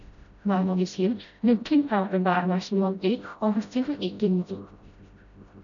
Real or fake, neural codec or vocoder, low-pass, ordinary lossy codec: fake; codec, 16 kHz, 0.5 kbps, FreqCodec, smaller model; 7.2 kHz; MP3, 96 kbps